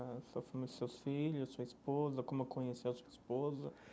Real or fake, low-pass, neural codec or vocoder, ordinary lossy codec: real; none; none; none